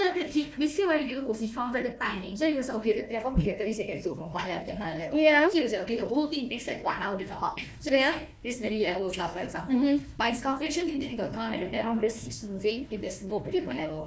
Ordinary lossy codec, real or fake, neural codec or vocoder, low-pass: none; fake; codec, 16 kHz, 1 kbps, FunCodec, trained on Chinese and English, 50 frames a second; none